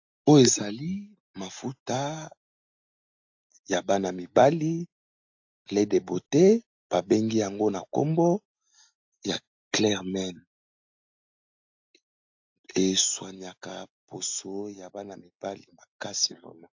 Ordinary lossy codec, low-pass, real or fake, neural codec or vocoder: AAC, 48 kbps; 7.2 kHz; real; none